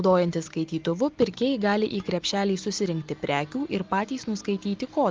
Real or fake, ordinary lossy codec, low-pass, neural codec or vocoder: real; Opus, 24 kbps; 7.2 kHz; none